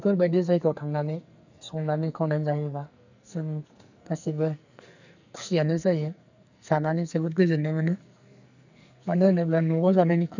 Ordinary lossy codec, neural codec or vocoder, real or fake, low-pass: none; codec, 44.1 kHz, 2.6 kbps, SNAC; fake; 7.2 kHz